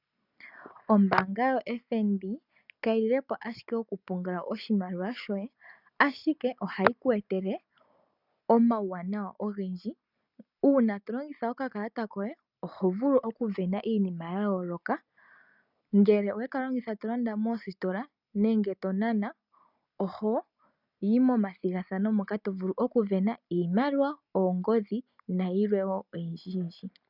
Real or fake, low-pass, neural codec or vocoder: real; 5.4 kHz; none